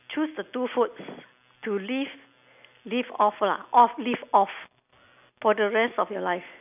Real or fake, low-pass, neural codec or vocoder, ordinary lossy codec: real; 3.6 kHz; none; none